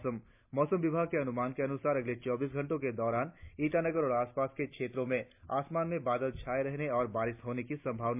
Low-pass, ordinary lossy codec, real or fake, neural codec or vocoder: 3.6 kHz; MP3, 32 kbps; real; none